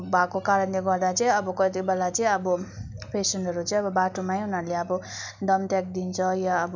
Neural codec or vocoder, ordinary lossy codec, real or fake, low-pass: none; none; real; 7.2 kHz